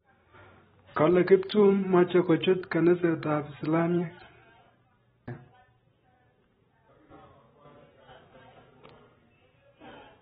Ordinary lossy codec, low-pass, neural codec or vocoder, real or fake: AAC, 16 kbps; 19.8 kHz; vocoder, 44.1 kHz, 128 mel bands every 512 samples, BigVGAN v2; fake